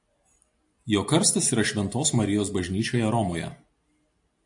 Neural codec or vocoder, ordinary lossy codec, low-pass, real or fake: none; AAC, 64 kbps; 10.8 kHz; real